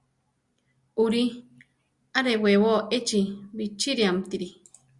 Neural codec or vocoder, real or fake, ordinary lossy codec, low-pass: none; real; Opus, 64 kbps; 10.8 kHz